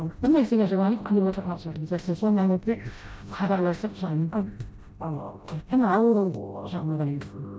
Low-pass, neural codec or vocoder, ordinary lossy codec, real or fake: none; codec, 16 kHz, 0.5 kbps, FreqCodec, smaller model; none; fake